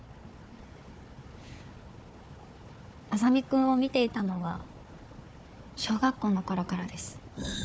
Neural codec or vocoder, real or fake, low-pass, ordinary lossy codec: codec, 16 kHz, 4 kbps, FunCodec, trained on Chinese and English, 50 frames a second; fake; none; none